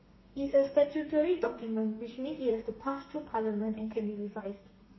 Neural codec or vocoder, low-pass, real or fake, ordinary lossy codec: codec, 32 kHz, 1.9 kbps, SNAC; 7.2 kHz; fake; MP3, 24 kbps